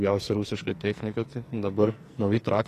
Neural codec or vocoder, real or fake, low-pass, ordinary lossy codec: codec, 44.1 kHz, 2.6 kbps, SNAC; fake; 14.4 kHz; AAC, 48 kbps